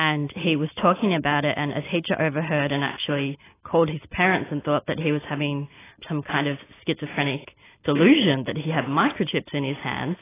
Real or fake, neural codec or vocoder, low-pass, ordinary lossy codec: real; none; 3.6 kHz; AAC, 16 kbps